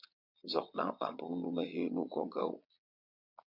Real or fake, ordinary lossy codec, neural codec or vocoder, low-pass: fake; MP3, 48 kbps; vocoder, 22.05 kHz, 80 mel bands, Vocos; 5.4 kHz